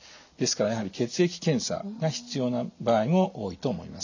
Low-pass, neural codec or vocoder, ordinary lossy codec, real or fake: 7.2 kHz; none; none; real